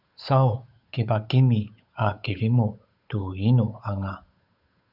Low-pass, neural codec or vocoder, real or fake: 5.4 kHz; codec, 16 kHz, 8 kbps, FunCodec, trained on Chinese and English, 25 frames a second; fake